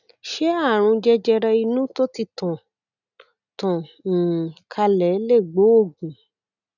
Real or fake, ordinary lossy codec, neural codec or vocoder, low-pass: real; none; none; 7.2 kHz